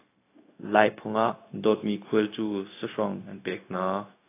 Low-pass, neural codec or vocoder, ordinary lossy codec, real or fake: 3.6 kHz; codec, 16 kHz, 0.4 kbps, LongCat-Audio-Codec; AAC, 24 kbps; fake